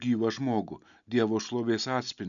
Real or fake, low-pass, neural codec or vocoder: real; 7.2 kHz; none